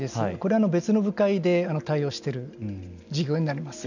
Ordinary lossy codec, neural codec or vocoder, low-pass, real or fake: none; none; 7.2 kHz; real